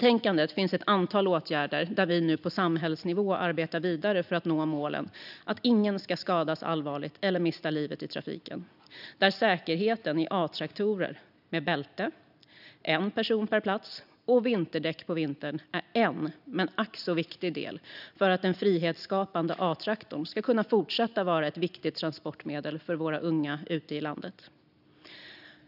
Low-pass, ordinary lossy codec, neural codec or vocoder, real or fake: 5.4 kHz; none; none; real